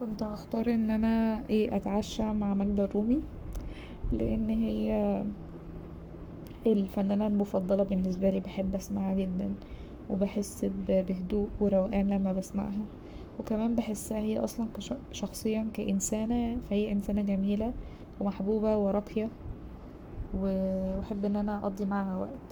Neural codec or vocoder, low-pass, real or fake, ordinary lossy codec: codec, 44.1 kHz, 7.8 kbps, Pupu-Codec; none; fake; none